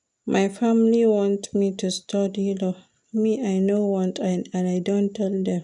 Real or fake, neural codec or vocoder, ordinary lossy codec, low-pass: real; none; none; 10.8 kHz